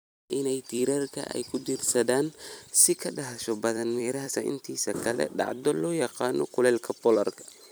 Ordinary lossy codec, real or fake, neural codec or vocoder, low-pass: none; fake; vocoder, 44.1 kHz, 128 mel bands every 256 samples, BigVGAN v2; none